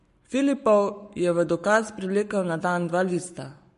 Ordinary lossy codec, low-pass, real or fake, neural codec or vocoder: MP3, 48 kbps; 14.4 kHz; fake; codec, 44.1 kHz, 7.8 kbps, Pupu-Codec